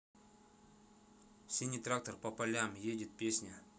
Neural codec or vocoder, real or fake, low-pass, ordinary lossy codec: none; real; none; none